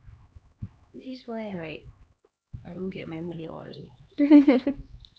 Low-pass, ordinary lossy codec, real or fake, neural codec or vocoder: none; none; fake; codec, 16 kHz, 2 kbps, X-Codec, HuBERT features, trained on LibriSpeech